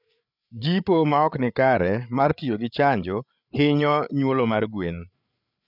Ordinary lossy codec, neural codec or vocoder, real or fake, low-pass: none; codec, 16 kHz, 8 kbps, FreqCodec, larger model; fake; 5.4 kHz